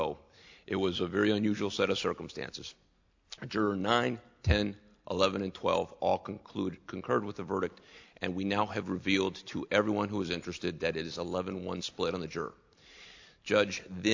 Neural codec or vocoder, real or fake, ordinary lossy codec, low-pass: none; real; AAC, 48 kbps; 7.2 kHz